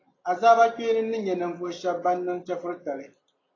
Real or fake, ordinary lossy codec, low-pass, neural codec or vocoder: real; AAC, 32 kbps; 7.2 kHz; none